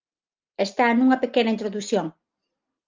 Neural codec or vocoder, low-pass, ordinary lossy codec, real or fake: none; 7.2 kHz; Opus, 24 kbps; real